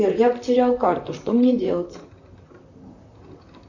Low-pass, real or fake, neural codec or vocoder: 7.2 kHz; fake; vocoder, 44.1 kHz, 128 mel bands, Pupu-Vocoder